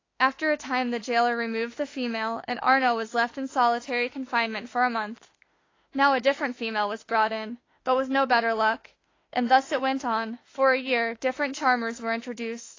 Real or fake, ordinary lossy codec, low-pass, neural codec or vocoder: fake; AAC, 32 kbps; 7.2 kHz; autoencoder, 48 kHz, 32 numbers a frame, DAC-VAE, trained on Japanese speech